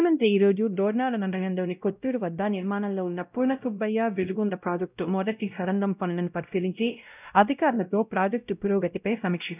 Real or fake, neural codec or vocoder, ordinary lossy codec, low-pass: fake; codec, 16 kHz, 0.5 kbps, X-Codec, WavLM features, trained on Multilingual LibriSpeech; none; 3.6 kHz